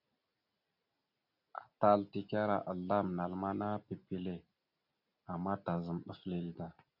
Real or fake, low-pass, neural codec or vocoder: real; 5.4 kHz; none